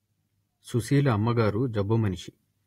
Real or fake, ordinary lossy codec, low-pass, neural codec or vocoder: real; AAC, 48 kbps; 19.8 kHz; none